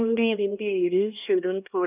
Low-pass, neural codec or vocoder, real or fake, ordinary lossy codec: 3.6 kHz; codec, 16 kHz, 1 kbps, X-Codec, HuBERT features, trained on LibriSpeech; fake; none